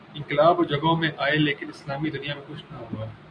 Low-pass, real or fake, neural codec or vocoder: 9.9 kHz; real; none